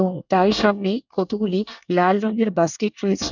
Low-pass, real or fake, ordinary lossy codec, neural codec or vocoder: 7.2 kHz; fake; none; codec, 24 kHz, 1 kbps, SNAC